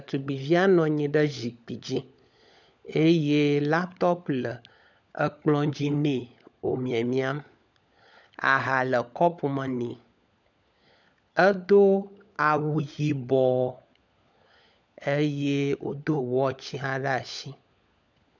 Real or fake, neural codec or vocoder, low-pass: fake; codec, 16 kHz, 16 kbps, FunCodec, trained on LibriTTS, 50 frames a second; 7.2 kHz